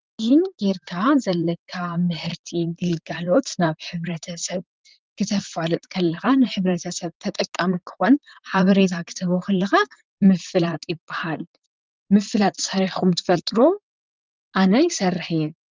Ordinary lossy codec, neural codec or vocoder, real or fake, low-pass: Opus, 32 kbps; vocoder, 44.1 kHz, 128 mel bands, Pupu-Vocoder; fake; 7.2 kHz